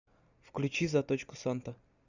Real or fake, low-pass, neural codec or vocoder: real; 7.2 kHz; none